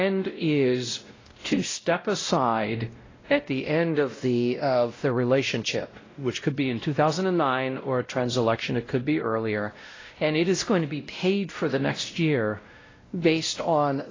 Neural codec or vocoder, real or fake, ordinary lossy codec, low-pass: codec, 16 kHz, 0.5 kbps, X-Codec, WavLM features, trained on Multilingual LibriSpeech; fake; AAC, 32 kbps; 7.2 kHz